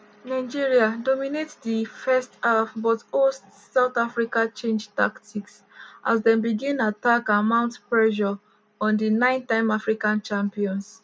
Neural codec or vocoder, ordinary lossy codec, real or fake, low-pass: none; none; real; none